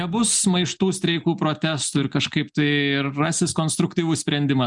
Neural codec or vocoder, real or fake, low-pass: none; real; 10.8 kHz